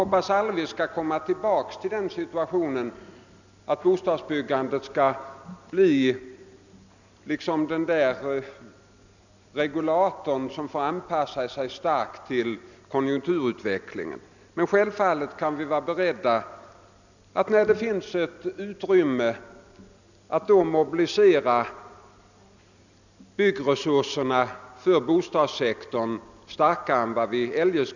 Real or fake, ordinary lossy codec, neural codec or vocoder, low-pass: real; none; none; 7.2 kHz